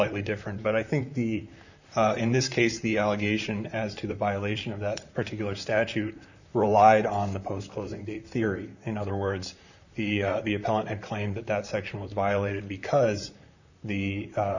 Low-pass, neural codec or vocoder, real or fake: 7.2 kHz; vocoder, 44.1 kHz, 128 mel bands, Pupu-Vocoder; fake